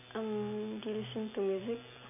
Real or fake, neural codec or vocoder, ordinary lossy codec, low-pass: real; none; none; 3.6 kHz